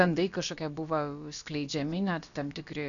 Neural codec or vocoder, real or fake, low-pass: codec, 16 kHz, about 1 kbps, DyCAST, with the encoder's durations; fake; 7.2 kHz